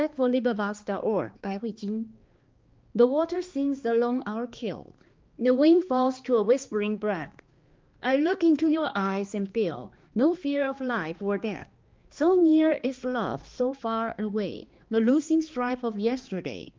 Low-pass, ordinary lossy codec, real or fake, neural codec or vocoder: 7.2 kHz; Opus, 32 kbps; fake; codec, 16 kHz, 2 kbps, X-Codec, HuBERT features, trained on balanced general audio